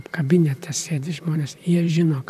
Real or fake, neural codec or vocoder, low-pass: fake; vocoder, 44.1 kHz, 128 mel bands, Pupu-Vocoder; 14.4 kHz